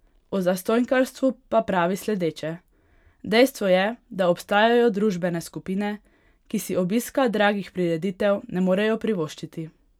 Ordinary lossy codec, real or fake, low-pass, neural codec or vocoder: none; real; 19.8 kHz; none